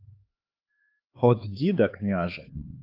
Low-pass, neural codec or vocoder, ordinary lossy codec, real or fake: 5.4 kHz; codec, 16 kHz, 4 kbps, X-Codec, HuBERT features, trained on LibriSpeech; Opus, 24 kbps; fake